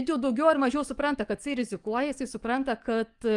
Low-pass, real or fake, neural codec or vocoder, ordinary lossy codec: 10.8 kHz; fake; codec, 44.1 kHz, 7.8 kbps, DAC; Opus, 32 kbps